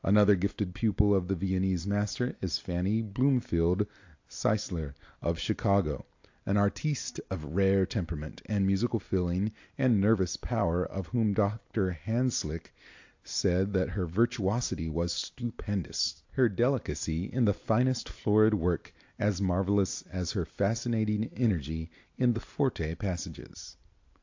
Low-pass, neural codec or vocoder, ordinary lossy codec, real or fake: 7.2 kHz; none; AAC, 48 kbps; real